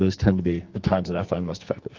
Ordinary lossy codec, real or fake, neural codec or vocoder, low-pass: Opus, 24 kbps; fake; codec, 44.1 kHz, 2.6 kbps, SNAC; 7.2 kHz